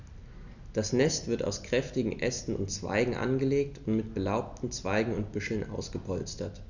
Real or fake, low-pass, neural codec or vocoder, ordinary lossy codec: real; 7.2 kHz; none; none